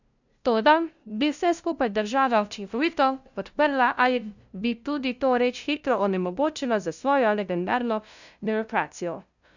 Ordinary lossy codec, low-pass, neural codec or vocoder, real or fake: none; 7.2 kHz; codec, 16 kHz, 0.5 kbps, FunCodec, trained on LibriTTS, 25 frames a second; fake